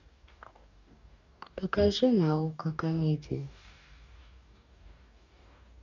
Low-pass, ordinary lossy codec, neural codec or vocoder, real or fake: 7.2 kHz; none; codec, 44.1 kHz, 2.6 kbps, DAC; fake